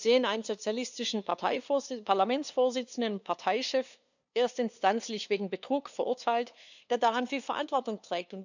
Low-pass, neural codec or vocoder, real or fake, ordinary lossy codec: 7.2 kHz; codec, 16 kHz, 2 kbps, FunCodec, trained on LibriTTS, 25 frames a second; fake; none